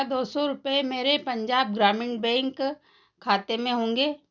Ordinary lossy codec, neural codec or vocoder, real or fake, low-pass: none; none; real; 7.2 kHz